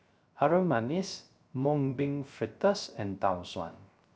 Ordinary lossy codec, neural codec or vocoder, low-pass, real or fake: none; codec, 16 kHz, 0.3 kbps, FocalCodec; none; fake